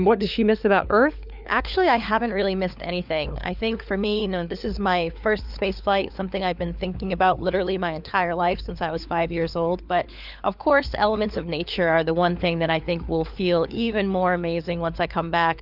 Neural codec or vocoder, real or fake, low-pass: codec, 16 kHz, 4 kbps, FunCodec, trained on LibriTTS, 50 frames a second; fake; 5.4 kHz